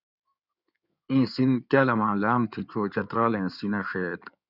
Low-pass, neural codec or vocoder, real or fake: 5.4 kHz; codec, 16 kHz in and 24 kHz out, 2.2 kbps, FireRedTTS-2 codec; fake